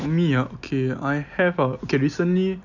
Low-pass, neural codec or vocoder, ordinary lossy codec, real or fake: 7.2 kHz; none; none; real